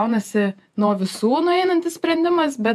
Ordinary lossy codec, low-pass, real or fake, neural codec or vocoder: AAC, 96 kbps; 14.4 kHz; fake; vocoder, 48 kHz, 128 mel bands, Vocos